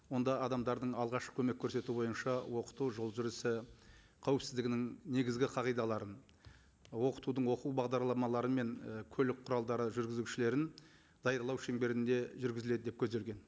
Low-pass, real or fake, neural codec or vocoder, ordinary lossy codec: none; real; none; none